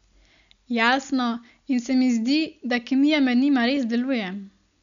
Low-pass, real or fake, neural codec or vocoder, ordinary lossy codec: 7.2 kHz; real; none; MP3, 96 kbps